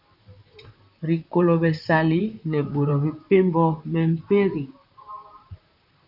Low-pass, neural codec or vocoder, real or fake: 5.4 kHz; vocoder, 44.1 kHz, 128 mel bands, Pupu-Vocoder; fake